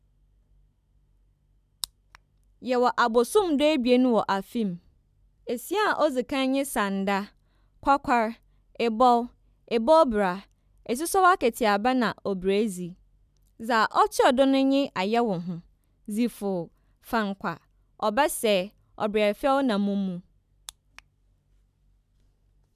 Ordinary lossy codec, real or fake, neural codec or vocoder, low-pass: none; real; none; 14.4 kHz